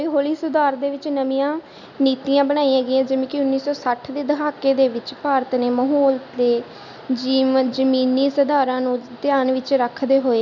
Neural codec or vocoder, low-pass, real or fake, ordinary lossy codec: none; 7.2 kHz; real; none